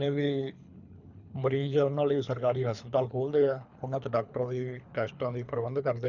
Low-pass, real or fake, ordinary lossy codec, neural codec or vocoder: 7.2 kHz; fake; none; codec, 24 kHz, 3 kbps, HILCodec